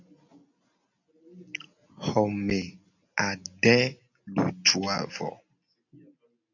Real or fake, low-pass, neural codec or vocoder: real; 7.2 kHz; none